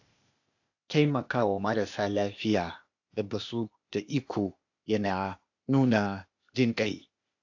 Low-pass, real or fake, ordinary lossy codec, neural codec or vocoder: 7.2 kHz; fake; AAC, 48 kbps; codec, 16 kHz, 0.8 kbps, ZipCodec